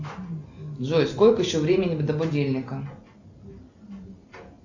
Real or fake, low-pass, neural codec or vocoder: real; 7.2 kHz; none